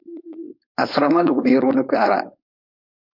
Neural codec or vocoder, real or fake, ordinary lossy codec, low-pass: codec, 16 kHz, 4.8 kbps, FACodec; fake; MP3, 48 kbps; 5.4 kHz